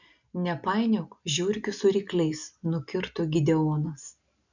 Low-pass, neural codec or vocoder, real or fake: 7.2 kHz; none; real